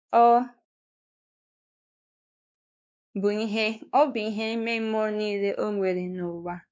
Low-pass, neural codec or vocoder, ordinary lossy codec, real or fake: none; codec, 16 kHz, 2 kbps, X-Codec, WavLM features, trained on Multilingual LibriSpeech; none; fake